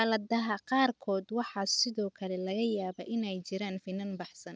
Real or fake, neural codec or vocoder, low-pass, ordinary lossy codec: real; none; none; none